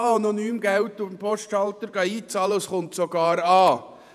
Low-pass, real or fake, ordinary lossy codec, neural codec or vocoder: 14.4 kHz; fake; none; vocoder, 48 kHz, 128 mel bands, Vocos